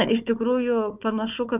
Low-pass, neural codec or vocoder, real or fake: 3.6 kHz; codec, 16 kHz, 4 kbps, FunCodec, trained on Chinese and English, 50 frames a second; fake